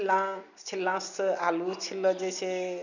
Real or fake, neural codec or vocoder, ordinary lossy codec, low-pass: real; none; none; 7.2 kHz